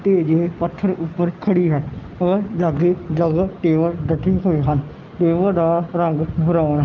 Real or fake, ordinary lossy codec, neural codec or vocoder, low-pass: real; Opus, 24 kbps; none; 7.2 kHz